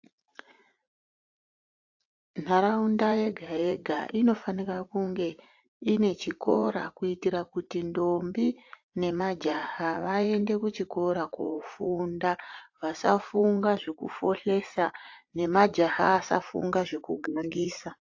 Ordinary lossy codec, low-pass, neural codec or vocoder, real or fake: AAC, 48 kbps; 7.2 kHz; vocoder, 44.1 kHz, 80 mel bands, Vocos; fake